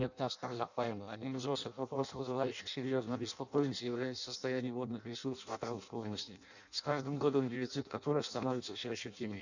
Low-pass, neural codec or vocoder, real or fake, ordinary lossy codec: 7.2 kHz; codec, 16 kHz in and 24 kHz out, 0.6 kbps, FireRedTTS-2 codec; fake; none